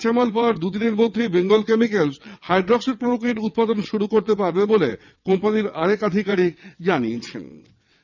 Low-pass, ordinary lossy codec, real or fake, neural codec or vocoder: 7.2 kHz; none; fake; vocoder, 22.05 kHz, 80 mel bands, WaveNeXt